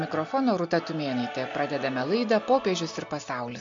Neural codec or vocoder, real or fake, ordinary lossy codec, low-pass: none; real; MP3, 48 kbps; 7.2 kHz